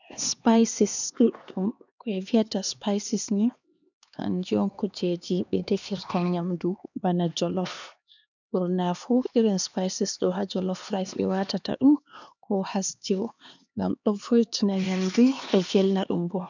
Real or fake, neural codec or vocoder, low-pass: fake; codec, 16 kHz, 2 kbps, X-Codec, HuBERT features, trained on LibriSpeech; 7.2 kHz